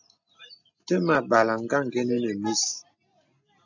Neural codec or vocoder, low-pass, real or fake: none; 7.2 kHz; real